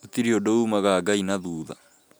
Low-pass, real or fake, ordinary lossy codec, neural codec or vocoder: none; real; none; none